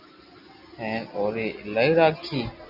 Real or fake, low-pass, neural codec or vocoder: real; 5.4 kHz; none